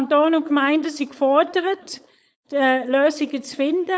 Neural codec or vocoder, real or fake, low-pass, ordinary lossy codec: codec, 16 kHz, 4.8 kbps, FACodec; fake; none; none